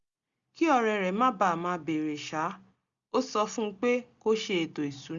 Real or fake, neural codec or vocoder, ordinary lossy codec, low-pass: real; none; Opus, 64 kbps; 7.2 kHz